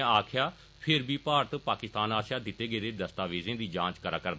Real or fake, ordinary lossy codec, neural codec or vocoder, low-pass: real; none; none; none